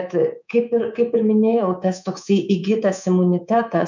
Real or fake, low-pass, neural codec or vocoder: fake; 7.2 kHz; codec, 24 kHz, 3.1 kbps, DualCodec